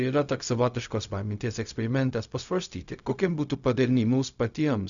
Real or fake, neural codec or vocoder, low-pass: fake; codec, 16 kHz, 0.4 kbps, LongCat-Audio-Codec; 7.2 kHz